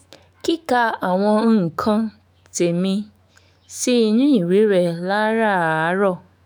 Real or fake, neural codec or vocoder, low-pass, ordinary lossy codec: fake; autoencoder, 48 kHz, 128 numbers a frame, DAC-VAE, trained on Japanese speech; none; none